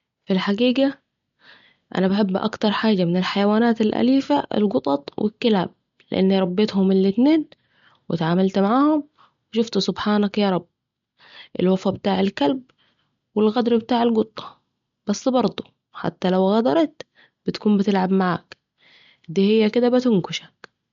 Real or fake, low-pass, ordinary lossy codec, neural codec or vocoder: real; 7.2 kHz; MP3, 48 kbps; none